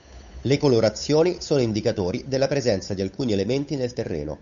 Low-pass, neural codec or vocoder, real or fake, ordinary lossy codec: 7.2 kHz; codec, 16 kHz, 8 kbps, FunCodec, trained on Chinese and English, 25 frames a second; fake; MP3, 96 kbps